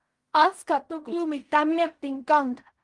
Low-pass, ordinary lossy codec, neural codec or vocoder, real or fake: 10.8 kHz; Opus, 24 kbps; codec, 16 kHz in and 24 kHz out, 0.4 kbps, LongCat-Audio-Codec, fine tuned four codebook decoder; fake